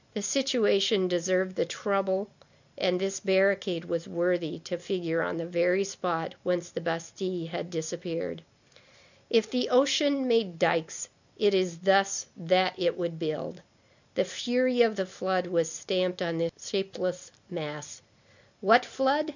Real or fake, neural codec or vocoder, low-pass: real; none; 7.2 kHz